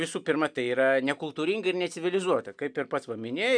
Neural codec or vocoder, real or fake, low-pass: none; real; 9.9 kHz